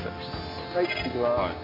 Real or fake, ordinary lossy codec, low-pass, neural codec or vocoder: real; AAC, 32 kbps; 5.4 kHz; none